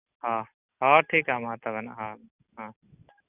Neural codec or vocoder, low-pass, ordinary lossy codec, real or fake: none; 3.6 kHz; Opus, 64 kbps; real